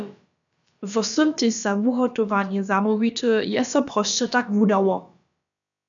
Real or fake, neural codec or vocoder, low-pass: fake; codec, 16 kHz, about 1 kbps, DyCAST, with the encoder's durations; 7.2 kHz